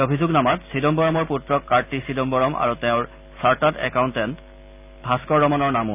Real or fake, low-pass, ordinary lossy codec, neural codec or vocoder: real; 3.6 kHz; none; none